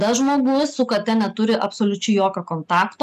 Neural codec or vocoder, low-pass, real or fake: none; 14.4 kHz; real